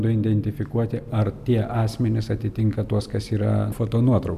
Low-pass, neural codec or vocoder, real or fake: 14.4 kHz; none; real